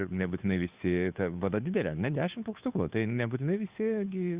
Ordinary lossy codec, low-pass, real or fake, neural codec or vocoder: Opus, 64 kbps; 3.6 kHz; fake; codec, 16 kHz, 2 kbps, FunCodec, trained on Chinese and English, 25 frames a second